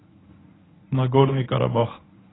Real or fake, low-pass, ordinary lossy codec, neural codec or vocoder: fake; 7.2 kHz; AAC, 16 kbps; codec, 24 kHz, 0.9 kbps, WavTokenizer, medium speech release version 1